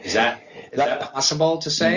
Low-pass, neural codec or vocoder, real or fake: 7.2 kHz; none; real